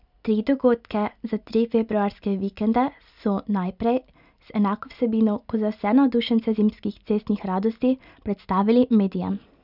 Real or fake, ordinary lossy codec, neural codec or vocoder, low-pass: real; none; none; 5.4 kHz